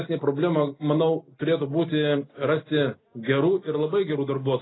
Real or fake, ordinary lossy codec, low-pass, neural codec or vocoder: real; AAC, 16 kbps; 7.2 kHz; none